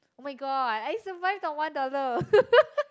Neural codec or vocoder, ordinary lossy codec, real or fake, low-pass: none; none; real; none